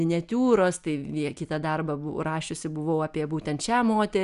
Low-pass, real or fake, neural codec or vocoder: 10.8 kHz; real; none